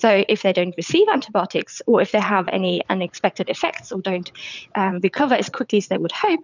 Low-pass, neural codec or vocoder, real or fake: 7.2 kHz; vocoder, 22.05 kHz, 80 mel bands, WaveNeXt; fake